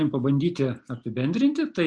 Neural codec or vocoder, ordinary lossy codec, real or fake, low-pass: none; Opus, 64 kbps; real; 9.9 kHz